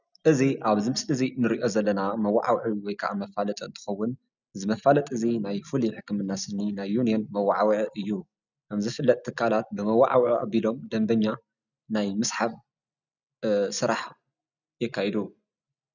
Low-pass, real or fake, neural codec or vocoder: 7.2 kHz; real; none